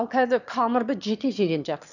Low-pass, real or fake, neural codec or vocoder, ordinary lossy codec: 7.2 kHz; fake; autoencoder, 22.05 kHz, a latent of 192 numbers a frame, VITS, trained on one speaker; none